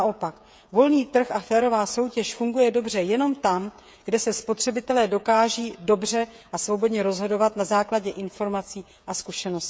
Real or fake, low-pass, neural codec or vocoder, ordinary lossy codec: fake; none; codec, 16 kHz, 16 kbps, FreqCodec, smaller model; none